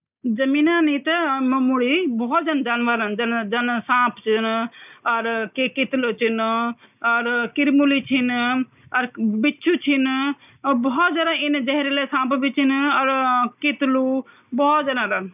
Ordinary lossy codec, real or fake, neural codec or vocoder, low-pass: none; real; none; 3.6 kHz